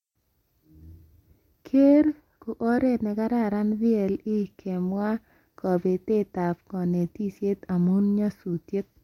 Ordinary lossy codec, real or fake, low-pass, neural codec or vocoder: MP3, 64 kbps; real; 19.8 kHz; none